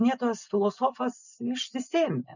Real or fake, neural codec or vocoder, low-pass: real; none; 7.2 kHz